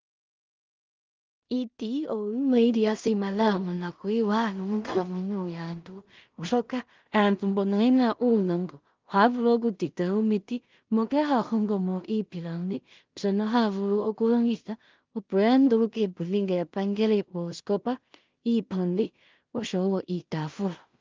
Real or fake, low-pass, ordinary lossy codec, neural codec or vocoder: fake; 7.2 kHz; Opus, 24 kbps; codec, 16 kHz in and 24 kHz out, 0.4 kbps, LongCat-Audio-Codec, two codebook decoder